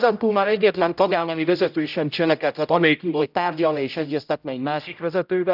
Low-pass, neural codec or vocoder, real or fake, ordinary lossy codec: 5.4 kHz; codec, 16 kHz, 0.5 kbps, X-Codec, HuBERT features, trained on general audio; fake; none